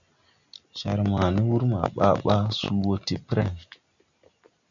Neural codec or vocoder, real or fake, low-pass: none; real; 7.2 kHz